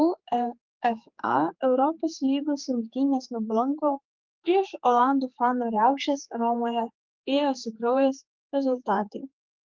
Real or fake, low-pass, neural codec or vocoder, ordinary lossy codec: fake; 7.2 kHz; codec, 16 kHz, 4 kbps, X-Codec, HuBERT features, trained on general audio; Opus, 32 kbps